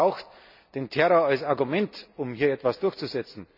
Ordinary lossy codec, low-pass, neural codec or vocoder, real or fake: none; 5.4 kHz; none; real